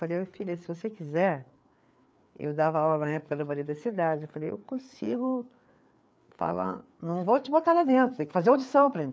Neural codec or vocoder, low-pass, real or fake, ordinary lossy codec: codec, 16 kHz, 4 kbps, FreqCodec, larger model; none; fake; none